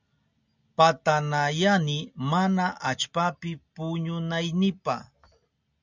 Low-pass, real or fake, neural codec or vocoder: 7.2 kHz; real; none